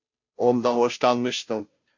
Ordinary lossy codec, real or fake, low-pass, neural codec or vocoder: MP3, 48 kbps; fake; 7.2 kHz; codec, 16 kHz, 0.5 kbps, FunCodec, trained on Chinese and English, 25 frames a second